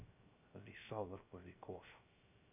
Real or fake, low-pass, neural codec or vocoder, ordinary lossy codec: fake; 3.6 kHz; codec, 16 kHz, 0.3 kbps, FocalCodec; none